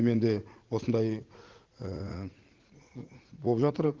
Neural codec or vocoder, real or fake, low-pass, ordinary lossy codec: vocoder, 44.1 kHz, 80 mel bands, Vocos; fake; 7.2 kHz; Opus, 16 kbps